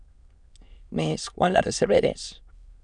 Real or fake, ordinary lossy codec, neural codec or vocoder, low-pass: fake; MP3, 96 kbps; autoencoder, 22.05 kHz, a latent of 192 numbers a frame, VITS, trained on many speakers; 9.9 kHz